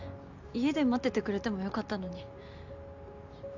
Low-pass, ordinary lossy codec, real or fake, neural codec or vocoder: 7.2 kHz; none; real; none